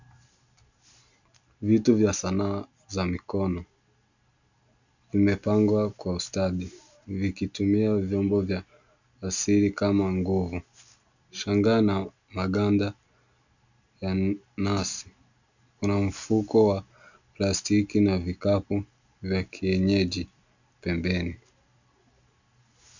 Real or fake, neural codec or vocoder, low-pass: real; none; 7.2 kHz